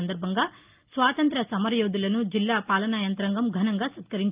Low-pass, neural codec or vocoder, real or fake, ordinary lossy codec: 3.6 kHz; none; real; Opus, 24 kbps